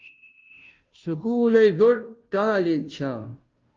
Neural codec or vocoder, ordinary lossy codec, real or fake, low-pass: codec, 16 kHz, 0.5 kbps, FunCodec, trained on Chinese and English, 25 frames a second; Opus, 24 kbps; fake; 7.2 kHz